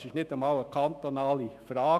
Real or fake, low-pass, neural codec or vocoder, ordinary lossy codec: fake; 14.4 kHz; autoencoder, 48 kHz, 128 numbers a frame, DAC-VAE, trained on Japanese speech; none